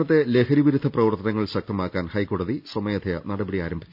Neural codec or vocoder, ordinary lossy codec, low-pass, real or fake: none; none; 5.4 kHz; real